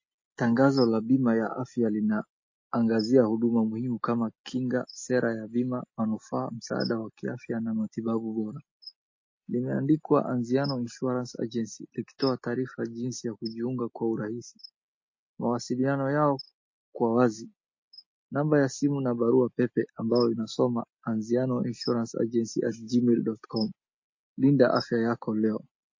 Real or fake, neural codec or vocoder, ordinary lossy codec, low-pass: real; none; MP3, 32 kbps; 7.2 kHz